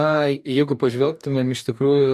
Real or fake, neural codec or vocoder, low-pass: fake; codec, 44.1 kHz, 2.6 kbps, DAC; 14.4 kHz